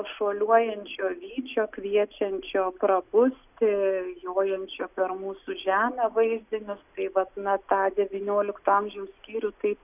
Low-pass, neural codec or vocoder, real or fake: 3.6 kHz; none; real